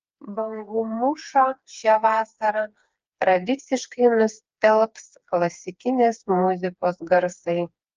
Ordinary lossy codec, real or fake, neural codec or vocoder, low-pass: Opus, 24 kbps; fake; codec, 16 kHz, 4 kbps, FreqCodec, smaller model; 7.2 kHz